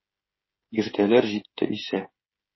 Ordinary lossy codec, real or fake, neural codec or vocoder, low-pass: MP3, 24 kbps; fake; codec, 16 kHz, 16 kbps, FreqCodec, smaller model; 7.2 kHz